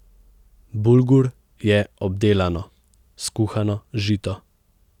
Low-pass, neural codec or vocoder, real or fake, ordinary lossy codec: 19.8 kHz; none; real; none